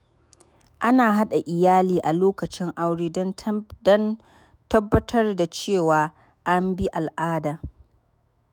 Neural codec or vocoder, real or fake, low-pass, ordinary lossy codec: autoencoder, 48 kHz, 128 numbers a frame, DAC-VAE, trained on Japanese speech; fake; none; none